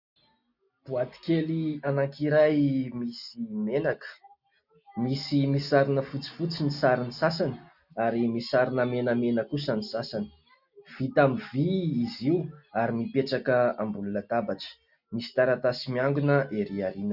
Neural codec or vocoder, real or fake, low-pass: none; real; 5.4 kHz